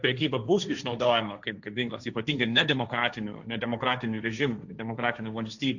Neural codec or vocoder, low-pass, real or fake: codec, 16 kHz, 1.1 kbps, Voila-Tokenizer; 7.2 kHz; fake